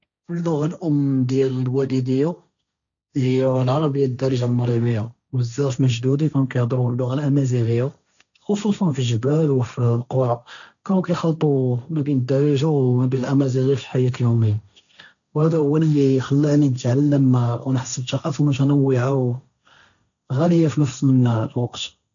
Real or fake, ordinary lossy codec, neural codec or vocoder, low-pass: fake; none; codec, 16 kHz, 1.1 kbps, Voila-Tokenizer; 7.2 kHz